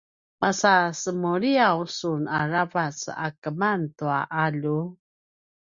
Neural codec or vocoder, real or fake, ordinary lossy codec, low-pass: none; real; Opus, 64 kbps; 7.2 kHz